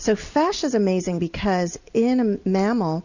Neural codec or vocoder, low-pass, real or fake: none; 7.2 kHz; real